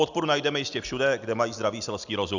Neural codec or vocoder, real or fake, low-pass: none; real; 7.2 kHz